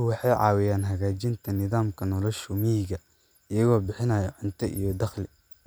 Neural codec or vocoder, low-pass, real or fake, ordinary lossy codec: none; none; real; none